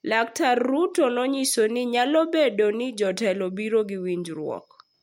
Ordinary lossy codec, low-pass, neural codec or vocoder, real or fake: MP3, 64 kbps; 19.8 kHz; none; real